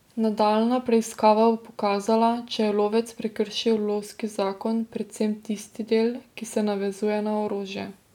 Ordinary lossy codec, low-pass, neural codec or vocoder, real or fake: none; 19.8 kHz; none; real